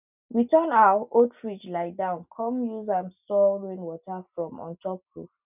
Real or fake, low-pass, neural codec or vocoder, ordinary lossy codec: real; 3.6 kHz; none; none